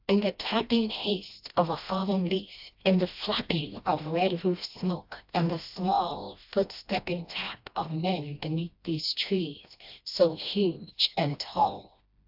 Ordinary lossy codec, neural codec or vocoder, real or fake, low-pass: AAC, 48 kbps; codec, 16 kHz, 1 kbps, FreqCodec, smaller model; fake; 5.4 kHz